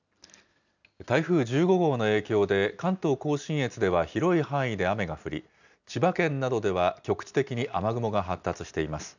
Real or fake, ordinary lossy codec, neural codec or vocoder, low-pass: real; none; none; 7.2 kHz